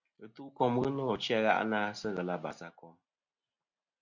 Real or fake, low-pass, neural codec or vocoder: real; 7.2 kHz; none